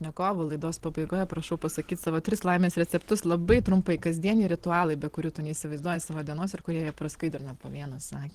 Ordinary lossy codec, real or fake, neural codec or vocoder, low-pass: Opus, 16 kbps; fake; vocoder, 44.1 kHz, 128 mel bands every 512 samples, BigVGAN v2; 14.4 kHz